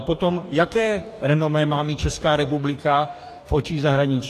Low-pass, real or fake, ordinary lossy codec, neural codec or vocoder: 14.4 kHz; fake; AAC, 64 kbps; codec, 44.1 kHz, 2.6 kbps, DAC